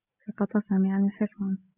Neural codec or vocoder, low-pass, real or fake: none; 3.6 kHz; real